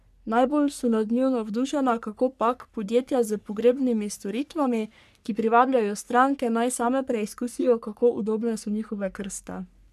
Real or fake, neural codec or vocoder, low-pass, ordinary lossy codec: fake; codec, 44.1 kHz, 3.4 kbps, Pupu-Codec; 14.4 kHz; none